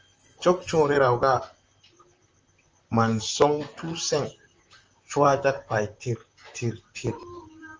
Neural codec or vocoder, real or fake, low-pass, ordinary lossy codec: vocoder, 44.1 kHz, 128 mel bands, Pupu-Vocoder; fake; 7.2 kHz; Opus, 24 kbps